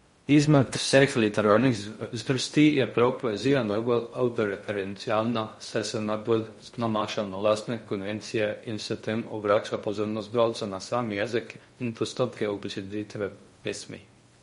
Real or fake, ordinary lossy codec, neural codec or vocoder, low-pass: fake; MP3, 48 kbps; codec, 16 kHz in and 24 kHz out, 0.6 kbps, FocalCodec, streaming, 4096 codes; 10.8 kHz